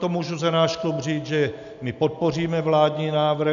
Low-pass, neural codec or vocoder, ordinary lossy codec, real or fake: 7.2 kHz; none; MP3, 96 kbps; real